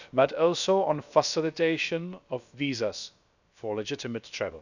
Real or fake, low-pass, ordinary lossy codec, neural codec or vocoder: fake; 7.2 kHz; none; codec, 16 kHz, about 1 kbps, DyCAST, with the encoder's durations